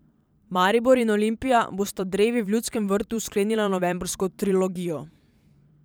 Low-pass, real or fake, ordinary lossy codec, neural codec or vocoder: none; fake; none; vocoder, 44.1 kHz, 128 mel bands every 512 samples, BigVGAN v2